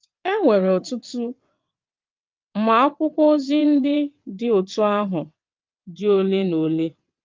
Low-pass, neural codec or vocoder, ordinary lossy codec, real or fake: 7.2 kHz; vocoder, 22.05 kHz, 80 mel bands, WaveNeXt; Opus, 24 kbps; fake